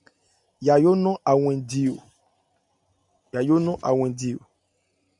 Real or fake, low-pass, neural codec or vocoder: real; 10.8 kHz; none